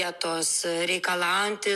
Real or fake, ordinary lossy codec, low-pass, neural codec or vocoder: real; MP3, 96 kbps; 14.4 kHz; none